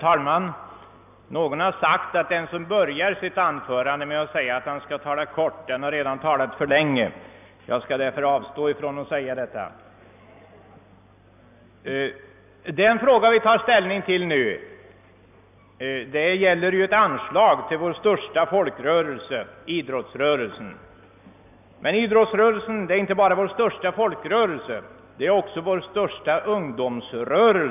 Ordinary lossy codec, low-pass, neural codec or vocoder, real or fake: none; 3.6 kHz; none; real